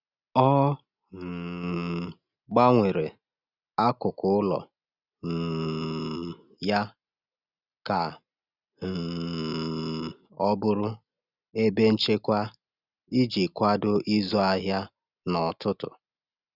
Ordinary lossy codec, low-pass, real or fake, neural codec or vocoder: Opus, 64 kbps; 5.4 kHz; real; none